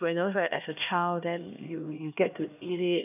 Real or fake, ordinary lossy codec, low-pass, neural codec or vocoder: fake; none; 3.6 kHz; codec, 16 kHz, 1 kbps, X-Codec, HuBERT features, trained on LibriSpeech